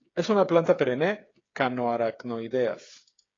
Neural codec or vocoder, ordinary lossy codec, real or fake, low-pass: codec, 16 kHz, 8 kbps, FreqCodec, smaller model; AAC, 32 kbps; fake; 7.2 kHz